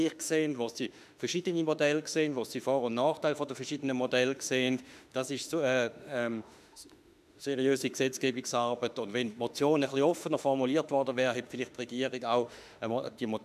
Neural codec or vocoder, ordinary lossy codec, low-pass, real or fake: autoencoder, 48 kHz, 32 numbers a frame, DAC-VAE, trained on Japanese speech; none; 14.4 kHz; fake